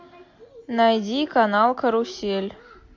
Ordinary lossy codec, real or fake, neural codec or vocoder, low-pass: MP3, 48 kbps; real; none; 7.2 kHz